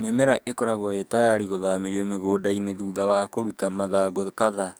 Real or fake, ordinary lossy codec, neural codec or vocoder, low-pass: fake; none; codec, 44.1 kHz, 2.6 kbps, SNAC; none